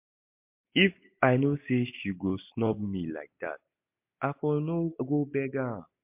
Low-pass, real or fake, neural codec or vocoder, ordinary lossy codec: 3.6 kHz; real; none; MP3, 32 kbps